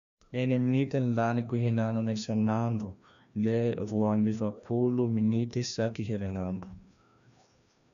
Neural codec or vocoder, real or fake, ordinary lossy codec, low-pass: codec, 16 kHz, 1 kbps, FreqCodec, larger model; fake; none; 7.2 kHz